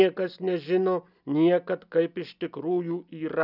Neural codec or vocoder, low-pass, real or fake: none; 5.4 kHz; real